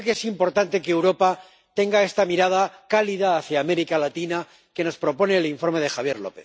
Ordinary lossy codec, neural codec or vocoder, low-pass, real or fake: none; none; none; real